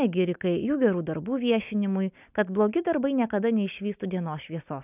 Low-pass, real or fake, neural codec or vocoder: 3.6 kHz; real; none